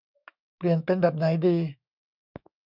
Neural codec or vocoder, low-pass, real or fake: autoencoder, 48 kHz, 128 numbers a frame, DAC-VAE, trained on Japanese speech; 5.4 kHz; fake